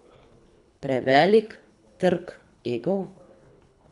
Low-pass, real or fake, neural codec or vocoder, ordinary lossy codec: 10.8 kHz; fake; codec, 24 kHz, 3 kbps, HILCodec; none